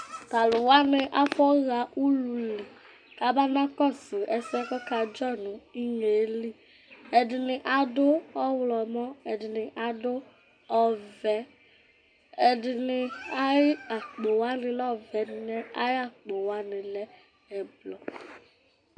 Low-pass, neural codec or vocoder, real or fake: 9.9 kHz; none; real